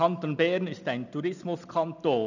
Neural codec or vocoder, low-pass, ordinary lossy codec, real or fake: none; 7.2 kHz; none; real